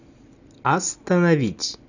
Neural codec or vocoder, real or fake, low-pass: none; real; 7.2 kHz